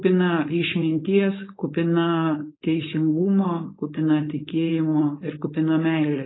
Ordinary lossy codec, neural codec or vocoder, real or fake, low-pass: AAC, 16 kbps; codec, 16 kHz, 4.8 kbps, FACodec; fake; 7.2 kHz